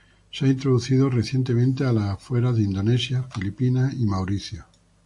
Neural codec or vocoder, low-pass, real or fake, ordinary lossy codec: none; 10.8 kHz; real; AAC, 64 kbps